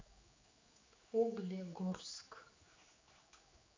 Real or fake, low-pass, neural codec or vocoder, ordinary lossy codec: fake; 7.2 kHz; codec, 16 kHz, 4 kbps, X-Codec, HuBERT features, trained on general audio; MP3, 64 kbps